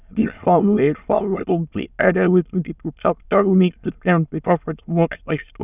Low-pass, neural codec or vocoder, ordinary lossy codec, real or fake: 3.6 kHz; autoencoder, 22.05 kHz, a latent of 192 numbers a frame, VITS, trained on many speakers; none; fake